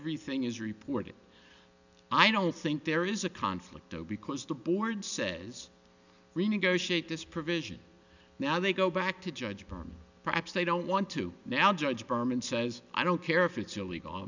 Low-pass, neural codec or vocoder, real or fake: 7.2 kHz; none; real